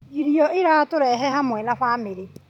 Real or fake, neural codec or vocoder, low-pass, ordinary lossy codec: fake; vocoder, 44.1 kHz, 128 mel bands every 512 samples, BigVGAN v2; 19.8 kHz; none